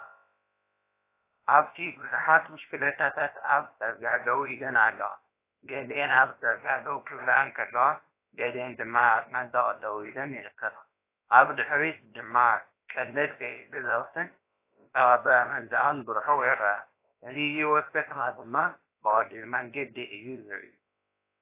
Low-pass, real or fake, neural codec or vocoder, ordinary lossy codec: 3.6 kHz; fake; codec, 16 kHz, about 1 kbps, DyCAST, with the encoder's durations; AAC, 24 kbps